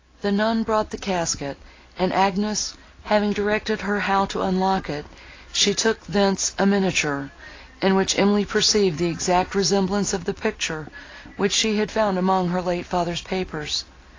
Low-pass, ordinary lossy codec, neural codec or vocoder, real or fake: 7.2 kHz; AAC, 32 kbps; none; real